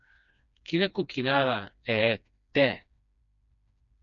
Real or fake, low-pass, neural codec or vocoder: fake; 7.2 kHz; codec, 16 kHz, 2 kbps, FreqCodec, smaller model